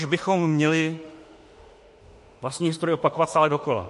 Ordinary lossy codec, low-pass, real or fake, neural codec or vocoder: MP3, 48 kbps; 14.4 kHz; fake; autoencoder, 48 kHz, 32 numbers a frame, DAC-VAE, trained on Japanese speech